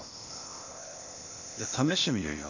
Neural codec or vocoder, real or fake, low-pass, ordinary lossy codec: codec, 16 kHz, 0.8 kbps, ZipCodec; fake; 7.2 kHz; none